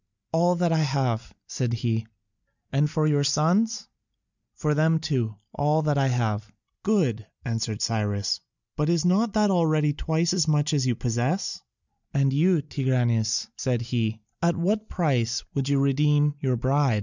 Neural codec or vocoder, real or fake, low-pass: none; real; 7.2 kHz